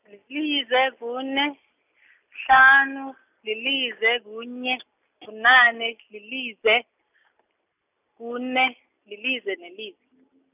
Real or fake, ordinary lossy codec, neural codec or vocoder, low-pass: real; none; none; 3.6 kHz